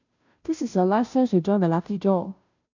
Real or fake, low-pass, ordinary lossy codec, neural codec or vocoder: fake; 7.2 kHz; none; codec, 16 kHz, 0.5 kbps, FunCodec, trained on Chinese and English, 25 frames a second